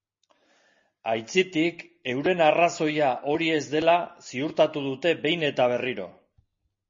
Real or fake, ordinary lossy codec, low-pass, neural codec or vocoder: real; MP3, 32 kbps; 7.2 kHz; none